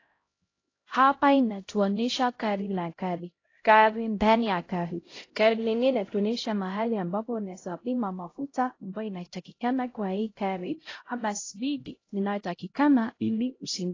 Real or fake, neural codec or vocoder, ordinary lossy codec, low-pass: fake; codec, 16 kHz, 0.5 kbps, X-Codec, HuBERT features, trained on LibriSpeech; AAC, 32 kbps; 7.2 kHz